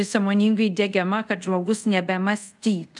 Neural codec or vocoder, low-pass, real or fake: codec, 24 kHz, 0.5 kbps, DualCodec; 10.8 kHz; fake